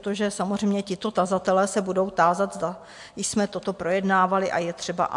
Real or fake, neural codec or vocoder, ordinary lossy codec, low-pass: real; none; MP3, 64 kbps; 10.8 kHz